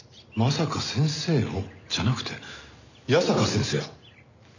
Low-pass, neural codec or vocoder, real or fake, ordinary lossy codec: 7.2 kHz; none; real; none